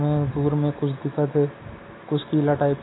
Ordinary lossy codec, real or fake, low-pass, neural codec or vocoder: AAC, 16 kbps; real; 7.2 kHz; none